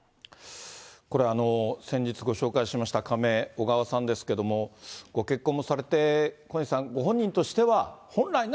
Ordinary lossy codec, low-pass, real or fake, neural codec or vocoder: none; none; real; none